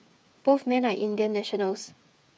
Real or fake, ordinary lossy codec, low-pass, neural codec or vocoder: fake; none; none; codec, 16 kHz, 8 kbps, FreqCodec, smaller model